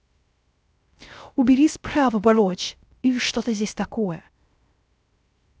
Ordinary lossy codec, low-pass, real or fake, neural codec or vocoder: none; none; fake; codec, 16 kHz, 0.3 kbps, FocalCodec